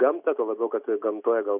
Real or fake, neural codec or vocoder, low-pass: real; none; 3.6 kHz